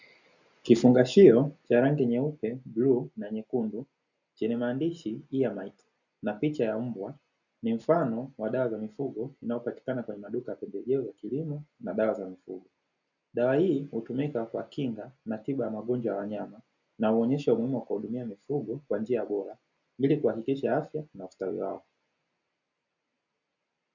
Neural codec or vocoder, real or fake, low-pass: none; real; 7.2 kHz